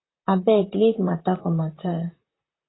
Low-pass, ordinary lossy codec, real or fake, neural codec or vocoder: 7.2 kHz; AAC, 16 kbps; fake; vocoder, 44.1 kHz, 128 mel bands, Pupu-Vocoder